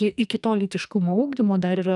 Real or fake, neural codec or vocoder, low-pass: fake; codec, 44.1 kHz, 2.6 kbps, SNAC; 10.8 kHz